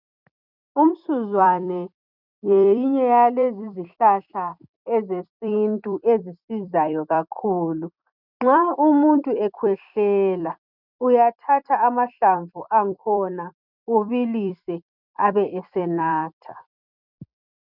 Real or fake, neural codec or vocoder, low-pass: fake; vocoder, 44.1 kHz, 128 mel bands every 256 samples, BigVGAN v2; 5.4 kHz